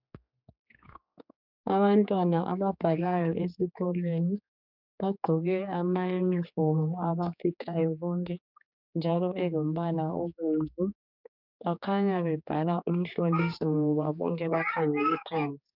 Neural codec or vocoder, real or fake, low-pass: codec, 16 kHz, 4 kbps, X-Codec, HuBERT features, trained on general audio; fake; 5.4 kHz